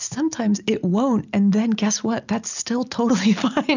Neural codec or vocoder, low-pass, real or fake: none; 7.2 kHz; real